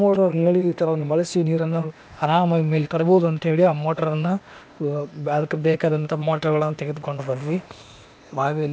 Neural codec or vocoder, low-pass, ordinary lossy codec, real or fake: codec, 16 kHz, 0.8 kbps, ZipCodec; none; none; fake